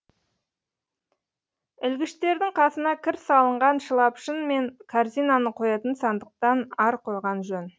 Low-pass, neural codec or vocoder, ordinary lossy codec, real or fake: none; none; none; real